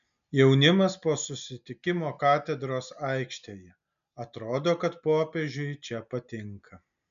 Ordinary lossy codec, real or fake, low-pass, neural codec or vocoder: MP3, 96 kbps; real; 7.2 kHz; none